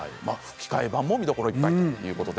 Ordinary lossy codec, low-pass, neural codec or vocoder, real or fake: none; none; none; real